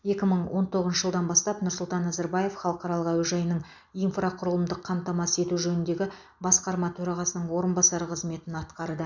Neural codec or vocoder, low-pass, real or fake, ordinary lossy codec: none; 7.2 kHz; real; none